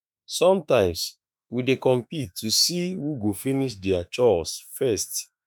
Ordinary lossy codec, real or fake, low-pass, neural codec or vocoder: none; fake; none; autoencoder, 48 kHz, 32 numbers a frame, DAC-VAE, trained on Japanese speech